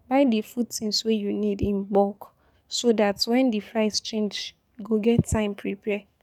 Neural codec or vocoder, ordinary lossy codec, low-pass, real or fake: codec, 44.1 kHz, 7.8 kbps, DAC; none; 19.8 kHz; fake